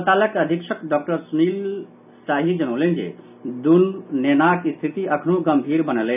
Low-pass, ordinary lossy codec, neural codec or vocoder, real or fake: 3.6 kHz; none; none; real